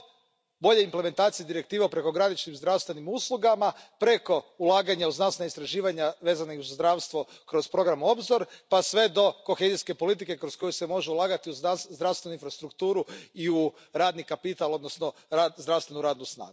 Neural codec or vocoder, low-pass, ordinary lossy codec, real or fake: none; none; none; real